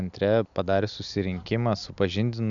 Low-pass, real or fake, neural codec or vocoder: 7.2 kHz; real; none